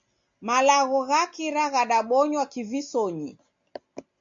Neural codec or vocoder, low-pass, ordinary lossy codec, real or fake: none; 7.2 kHz; MP3, 48 kbps; real